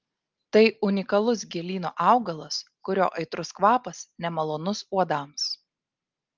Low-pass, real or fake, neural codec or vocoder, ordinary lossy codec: 7.2 kHz; real; none; Opus, 24 kbps